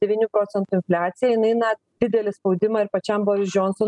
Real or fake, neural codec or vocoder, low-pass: real; none; 10.8 kHz